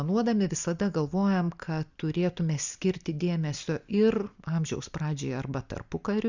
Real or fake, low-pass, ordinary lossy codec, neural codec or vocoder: real; 7.2 kHz; Opus, 64 kbps; none